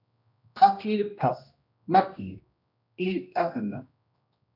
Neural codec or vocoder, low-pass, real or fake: codec, 16 kHz, 1 kbps, X-Codec, HuBERT features, trained on general audio; 5.4 kHz; fake